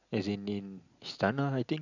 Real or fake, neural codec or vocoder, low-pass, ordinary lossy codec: real; none; 7.2 kHz; none